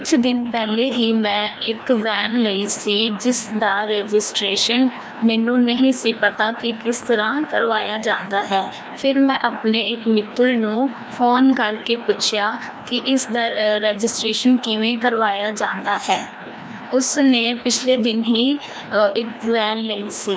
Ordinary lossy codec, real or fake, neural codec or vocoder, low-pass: none; fake; codec, 16 kHz, 1 kbps, FreqCodec, larger model; none